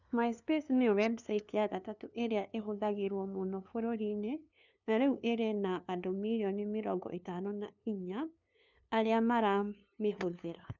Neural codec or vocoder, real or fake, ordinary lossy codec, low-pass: codec, 16 kHz, 2 kbps, FunCodec, trained on LibriTTS, 25 frames a second; fake; none; 7.2 kHz